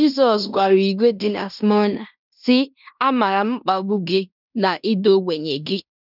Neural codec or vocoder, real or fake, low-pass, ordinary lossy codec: codec, 16 kHz in and 24 kHz out, 0.9 kbps, LongCat-Audio-Codec, fine tuned four codebook decoder; fake; 5.4 kHz; none